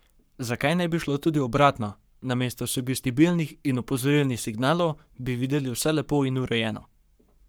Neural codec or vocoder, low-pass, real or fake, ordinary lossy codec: codec, 44.1 kHz, 7.8 kbps, Pupu-Codec; none; fake; none